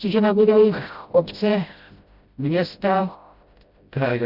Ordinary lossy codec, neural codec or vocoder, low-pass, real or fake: Opus, 64 kbps; codec, 16 kHz, 0.5 kbps, FreqCodec, smaller model; 5.4 kHz; fake